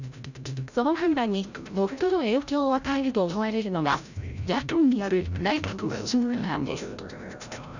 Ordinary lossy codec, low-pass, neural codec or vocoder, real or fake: none; 7.2 kHz; codec, 16 kHz, 0.5 kbps, FreqCodec, larger model; fake